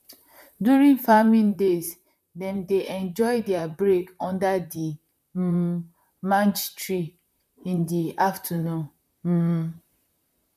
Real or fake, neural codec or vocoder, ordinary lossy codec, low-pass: fake; vocoder, 44.1 kHz, 128 mel bands, Pupu-Vocoder; none; 14.4 kHz